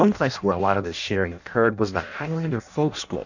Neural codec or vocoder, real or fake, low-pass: codec, 16 kHz in and 24 kHz out, 0.6 kbps, FireRedTTS-2 codec; fake; 7.2 kHz